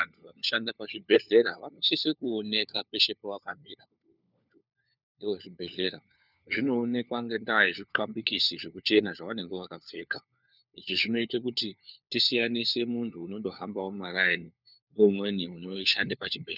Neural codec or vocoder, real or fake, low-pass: codec, 16 kHz, 4 kbps, FunCodec, trained on LibriTTS, 50 frames a second; fake; 5.4 kHz